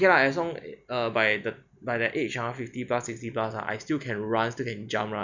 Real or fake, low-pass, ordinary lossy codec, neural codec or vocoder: real; 7.2 kHz; none; none